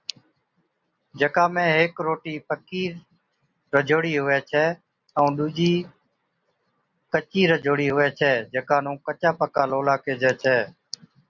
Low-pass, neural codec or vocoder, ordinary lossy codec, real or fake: 7.2 kHz; none; Opus, 64 kbps; real